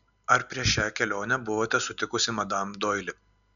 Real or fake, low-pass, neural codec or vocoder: real; 7.2 kHz; none